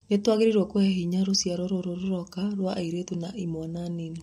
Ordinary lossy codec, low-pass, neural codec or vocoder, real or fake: MP3, 64 kbps; 19.8 kHz; none; real